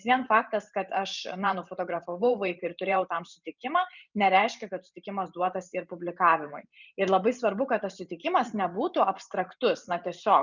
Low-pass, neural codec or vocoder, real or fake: 7.2 kHz; vocoder, 44.1 kHz, 128 mel bands every 512 samples, BigVGAN v2; fake